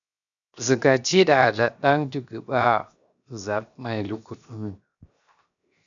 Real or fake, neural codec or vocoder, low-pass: fake; codec, 16 kHz, 0.7 kbps, FocalCodec; 7.2 kHz